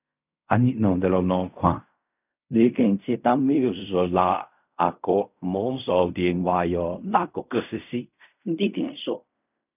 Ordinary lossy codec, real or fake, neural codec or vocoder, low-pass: none; fake; codec, 16 kHz in and 24 kHz out, 0.4 kbps, LongCat-Audio-Codec, fine tuned four codebook decoder; 3.6 kHz